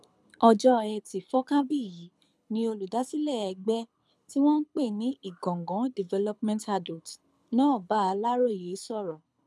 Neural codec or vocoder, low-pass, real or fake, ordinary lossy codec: codec, 24 kHz, 6 kbps, HILCodec; none; fake; none